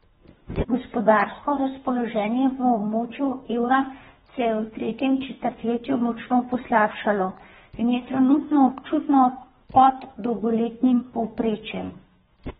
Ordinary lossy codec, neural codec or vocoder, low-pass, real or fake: AAC, 16 kbps; codec, 24 kHz, 3 kbps, HILCodec; 10.8 kHz; fake